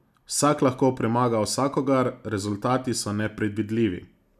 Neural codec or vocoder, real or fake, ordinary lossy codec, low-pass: none; real; none; 14.4 kHz